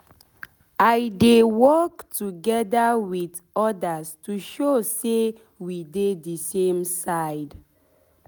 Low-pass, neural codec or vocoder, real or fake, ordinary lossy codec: none; none; real; none